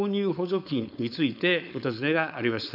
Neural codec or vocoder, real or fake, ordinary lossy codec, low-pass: codec, 16 kHz, 4.8 kbps, FACodec; fake; none; 5.4 kHz